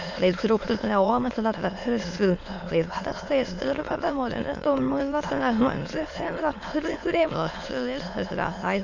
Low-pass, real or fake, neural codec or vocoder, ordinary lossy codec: 7.2 kHz; fake; autoencoder, 22.05 kHz, a latent of 192 numbers a frame, VITS, trained on many speakers; AAC, 48 kbps